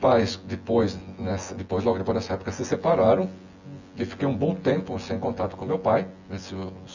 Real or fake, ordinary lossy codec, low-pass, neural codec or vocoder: fake; none; 7.2 kHz; vocoder, 24 kHz, 100 mel bands, Vocos